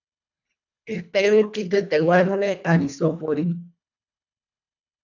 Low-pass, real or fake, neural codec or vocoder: 7.2 kHz; fake; codec, 24 kHz, 1.5 kbps, HILCodec